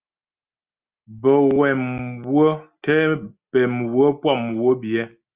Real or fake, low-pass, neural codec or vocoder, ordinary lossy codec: real; 3.6 kHz; none; Opus, 24 kbps